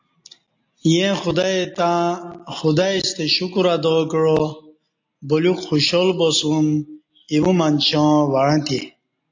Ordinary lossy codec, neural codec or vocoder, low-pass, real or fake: AAC, 48 kbps; none; 7.2 kHz; real